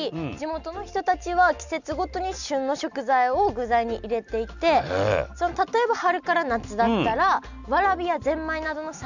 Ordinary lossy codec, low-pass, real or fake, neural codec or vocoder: none; 7.2 kHz; real; none